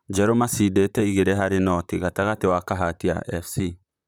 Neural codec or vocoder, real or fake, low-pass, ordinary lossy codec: vocoder, 44.1 kHz, 128 mel bands, Pupu-Vocoder; fake; none; none